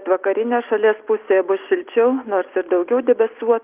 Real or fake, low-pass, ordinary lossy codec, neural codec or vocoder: real; 3.6 kHz; Opus, 24 kbps; none